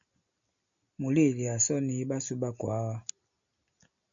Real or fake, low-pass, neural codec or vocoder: real; 7.2 kHz; none